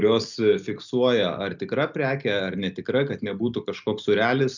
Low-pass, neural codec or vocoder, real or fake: 7.2 kHz; none; real